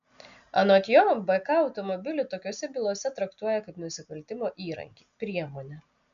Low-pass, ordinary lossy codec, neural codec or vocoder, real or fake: 7.2 kHz; MP3, 96 kbps; none; real